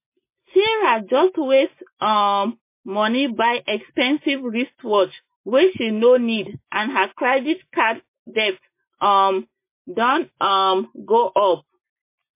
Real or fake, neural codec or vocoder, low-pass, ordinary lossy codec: real; none; 3.6 kHz; MP3, 24 kbps